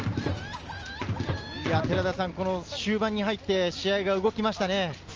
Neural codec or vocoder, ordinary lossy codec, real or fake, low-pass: none; Opus, 24 kbps; real; 7.2 kHz